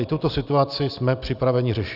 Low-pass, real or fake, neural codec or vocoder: 5.4 kHz; real; none